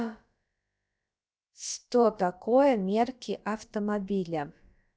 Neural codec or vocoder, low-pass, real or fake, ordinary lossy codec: codec, 16 kHz, about 1 kbps, DyCAST, with the encoder's durations; none; fake; none